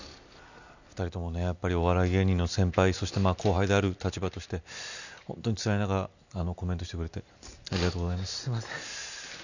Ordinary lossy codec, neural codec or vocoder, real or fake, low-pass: none; none; real; 7.2 kHz